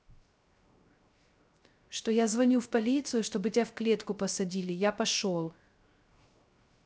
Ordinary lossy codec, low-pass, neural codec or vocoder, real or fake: none; none; codec, 16 kHz, 0.3 kbps, FocalCodec; fake